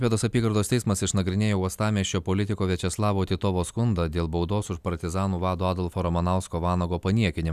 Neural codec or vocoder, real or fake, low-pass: none; real; 14.4 kHz